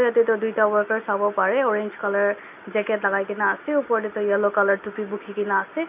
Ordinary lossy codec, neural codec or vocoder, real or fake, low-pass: none; none; real; 3.6 kHz